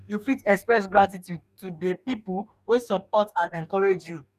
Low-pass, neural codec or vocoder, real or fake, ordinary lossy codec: 14.4 kHz; codec, 44.1 kHz, 2.6 kbps, DAC; fake; none